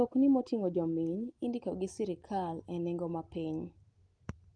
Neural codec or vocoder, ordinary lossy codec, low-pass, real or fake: none; Opus, 24 kbps; 9.9 kHz; real